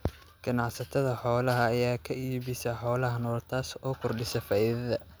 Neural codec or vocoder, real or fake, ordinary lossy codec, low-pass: none; real; none; none